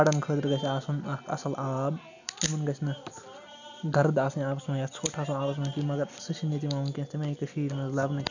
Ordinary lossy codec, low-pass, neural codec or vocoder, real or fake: none; 7.2 kHz; none; real